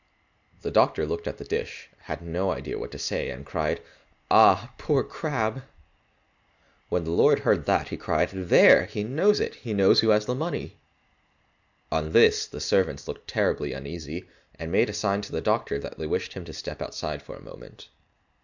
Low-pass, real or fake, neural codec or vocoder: 7.2 kHz; real; none